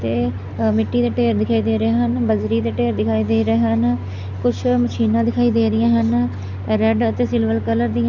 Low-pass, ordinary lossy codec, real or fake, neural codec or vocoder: 7.2 kHz; none; real; none